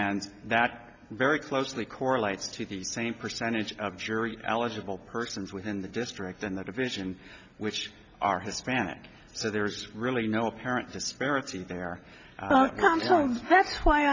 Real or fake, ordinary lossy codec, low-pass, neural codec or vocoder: real; MP3, 64 kbps; 7.2 kHz; none